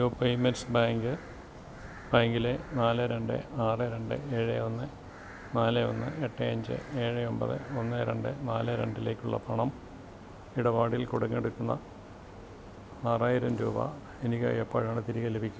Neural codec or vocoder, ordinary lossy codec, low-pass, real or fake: none; none; none; real